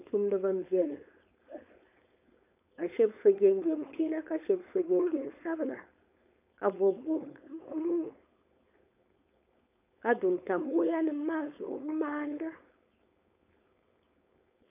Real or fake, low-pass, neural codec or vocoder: fake; 3.6 kHz; codec, 16 kHz, 4.8 kbps, FACodec